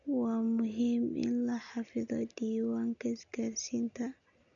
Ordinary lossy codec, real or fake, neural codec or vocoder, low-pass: none; real; none; 7.2 kHz